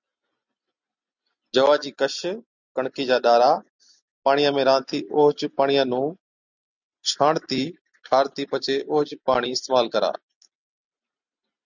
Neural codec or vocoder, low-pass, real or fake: none; 7.2 kHz; real